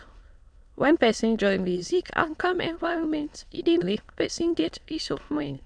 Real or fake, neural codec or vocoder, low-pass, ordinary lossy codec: fake; autoencoder, 22.05 kHz, a latent of 192 numbers a frame, VITS, trained on many speakers; 9.9 kHz; none